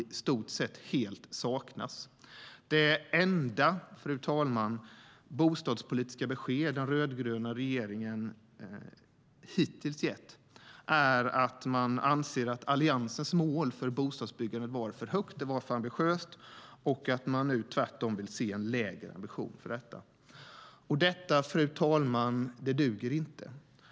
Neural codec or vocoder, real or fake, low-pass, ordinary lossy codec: none; real; none; none